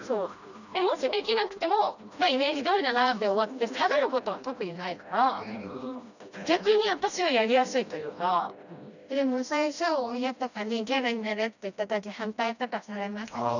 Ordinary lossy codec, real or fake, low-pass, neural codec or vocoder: none; fake; 7.2 kHz; codec, 16 kHz, 1 kbps, FreqCodec, smaller model